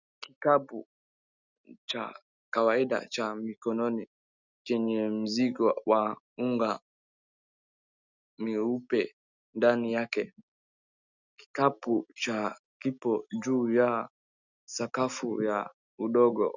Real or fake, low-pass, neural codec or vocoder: real; 7.2 kHz; none